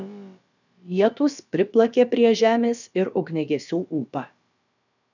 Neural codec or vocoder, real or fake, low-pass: codec, 16 kHz, about 1 kbps, DyCAST, with the encoder's durations; fake; 7.2 kHz